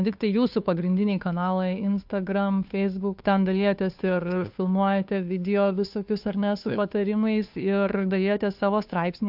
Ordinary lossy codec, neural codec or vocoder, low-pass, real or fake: AAC, 48 kbps; codec, 16 kHz, 2 kbps, FunCodec, trained on Chinese and English, 25 frames a second; 5.4 kHz; fake